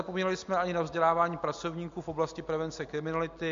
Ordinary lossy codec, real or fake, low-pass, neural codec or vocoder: MP3, 48 kbps; real; 7.2 kHz; none